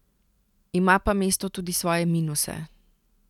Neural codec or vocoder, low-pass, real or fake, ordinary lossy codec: none; 19.8 kHz; real; none